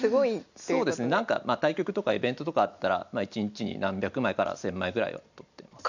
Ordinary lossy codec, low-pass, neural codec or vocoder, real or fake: none; 7.2 kHz; none; real